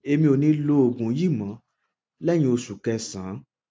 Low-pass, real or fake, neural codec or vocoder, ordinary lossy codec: none; real; none; none